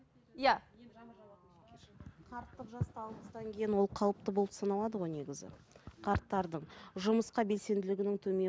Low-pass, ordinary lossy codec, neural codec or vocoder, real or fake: none; none; none; real